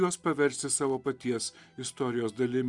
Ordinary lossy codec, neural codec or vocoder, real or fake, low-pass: Opus, 64 kbps; none; real; 10.8 kHz